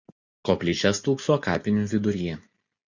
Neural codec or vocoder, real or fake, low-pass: none; real; 7.2 kHz